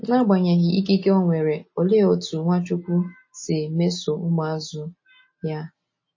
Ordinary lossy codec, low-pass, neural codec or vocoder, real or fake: MP3, 32 kbps; 7.2 kHz; none; real